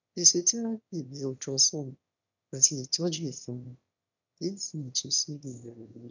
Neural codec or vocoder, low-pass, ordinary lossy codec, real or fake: autoencoder, 22.05 kHz, a latent of 192 numbers a frame, VITS, trained on one speaker; 7.2 kHz; none; fake